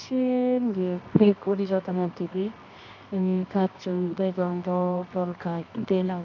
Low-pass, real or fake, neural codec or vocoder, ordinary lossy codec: 7.2 kHz; fake; codec, 24 kHz, 0.9 kbps, WavTokenizer, medium music audio release; none